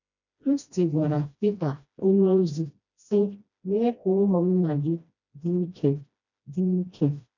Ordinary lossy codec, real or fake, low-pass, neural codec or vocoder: none; fake; 7.2 kHz; codec, 16 kHz, 1 kbps, FreqCodec, smaller model